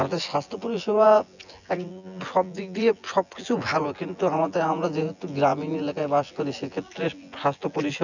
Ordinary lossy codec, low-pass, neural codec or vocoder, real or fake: none; 7.2 kHz; vocoder, 24 kHz, 100 mel bands, Vocos; fake